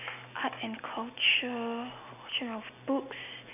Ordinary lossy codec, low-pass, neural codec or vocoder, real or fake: none; 3.6 kHz; none; real